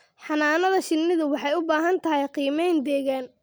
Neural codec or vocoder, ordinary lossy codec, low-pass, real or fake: none; none; none; real